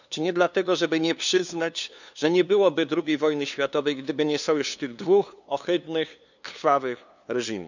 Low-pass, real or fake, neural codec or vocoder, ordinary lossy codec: 7.2 kHz; fake; codec, 16 kHz, 2 kbps, FunCodec, trained on LibriTTS, 25 frames a second; none